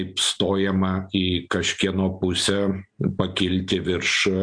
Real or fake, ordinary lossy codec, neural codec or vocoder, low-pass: real; MP3, 64 kbps; none; 9.9 kHz